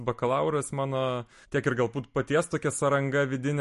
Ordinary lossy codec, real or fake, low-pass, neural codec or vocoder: MP3, 48 kbps; real; 14.4 kHz; none